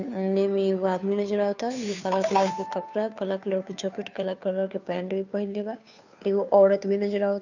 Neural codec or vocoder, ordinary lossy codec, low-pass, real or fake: codec, 16 kHz, 2 kbps, FunCodec, trained on Chinese and English, 25 frames a second; none; 7.2 kHz; fake